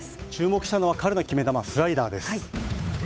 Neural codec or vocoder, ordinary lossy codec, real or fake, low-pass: codec, 16 kHz, 8 kbps, FunCodec, trained on Chinese and English, 25 frames a second; none; fake; none